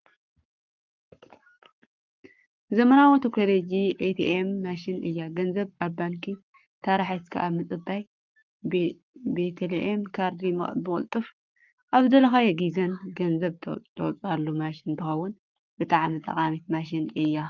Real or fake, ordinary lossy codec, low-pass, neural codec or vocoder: fake; Opus, 24 kbps; 7.2 kHz; codec, 44.1 kHz, 7.8 kbps, Pupu-Codec